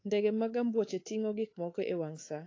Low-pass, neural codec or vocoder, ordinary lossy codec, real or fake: 7.2 kHz; codec, 24 kHz, 3.1 kbps, DualCodec; AAC, 32 kbps; fake